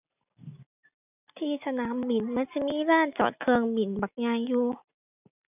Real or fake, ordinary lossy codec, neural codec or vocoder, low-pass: real; none; none; 3.6 kHz